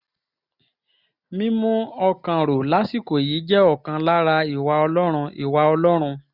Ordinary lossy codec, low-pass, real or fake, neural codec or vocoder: none; 5.4 kHz; real; none